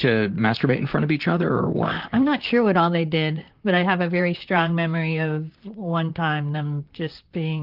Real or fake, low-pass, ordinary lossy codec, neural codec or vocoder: fake; 5.4 kHz; Opus, 24 kbps; vocoder, 44.1 kHz, 128 mel bands, Pupu-Vocoder